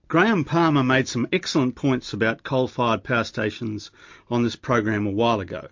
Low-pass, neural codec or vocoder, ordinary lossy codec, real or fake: 7.2 kHz; none; MP3, 48 kbps; real